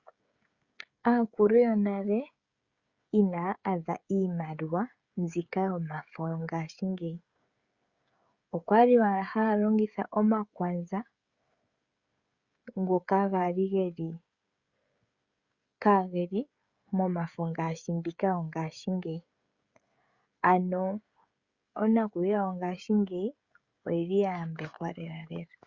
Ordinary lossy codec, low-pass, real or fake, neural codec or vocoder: Opus, 64 kbps; 7.2 kHz; fake; codec, 16 kHz, 16 kbps, FreqCodec, smaller model